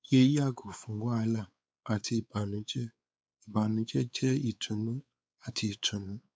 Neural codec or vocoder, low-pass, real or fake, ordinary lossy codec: codec, 16 kHz, 4 kbps, X-Codec, WavLM features, trained on Multilingual LibriSpeech; none; fake; none